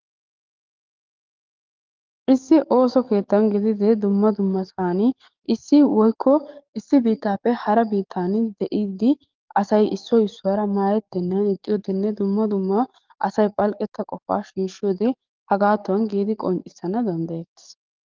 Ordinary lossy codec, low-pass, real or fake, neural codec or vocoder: Opus, 24 kbps; 7.2 kHz; fake; codec, 44.1 kHz, 7.8 kbps, DAC